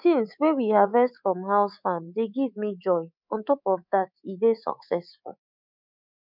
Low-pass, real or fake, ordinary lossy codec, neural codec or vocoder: 5.4 kHz; fake; none; codec, 24 kHz, 3.1 kbps, DualCodec